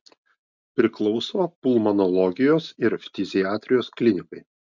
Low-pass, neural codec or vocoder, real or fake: 7.2 kHz; none; real